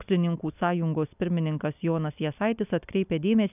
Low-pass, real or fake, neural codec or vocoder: 3.6 kHz; real; none